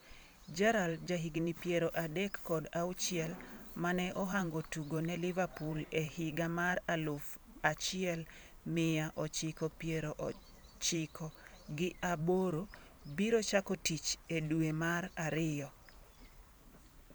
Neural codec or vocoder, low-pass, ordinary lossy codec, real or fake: vocoder, 44.1 kHz, 128 mel bands every 512 samples, BigVGAN v2; none; none; fake